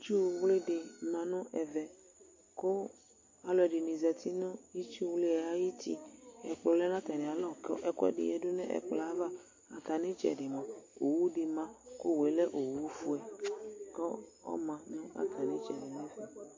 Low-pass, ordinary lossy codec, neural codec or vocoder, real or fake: 7.2 kHz; MP3, 32 kbps; none; real